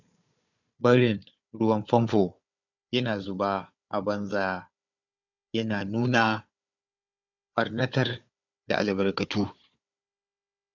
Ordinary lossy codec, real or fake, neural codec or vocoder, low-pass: none; fake; codec, 16 kHz, 4 kbps, FunCodec, trained on Chinese and English, 50 frames a second; 7.2 kHz